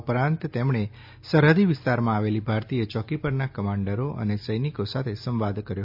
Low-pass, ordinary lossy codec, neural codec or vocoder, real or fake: 5.4 kHz; MP3, 48 kbps; none; real